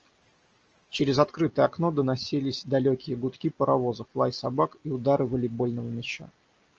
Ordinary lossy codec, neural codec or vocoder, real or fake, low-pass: Opus, 24 kbps; none; real; 7.2 kHz